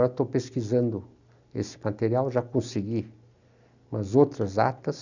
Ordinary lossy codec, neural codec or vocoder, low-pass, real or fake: none; none; 7.2 kHz; real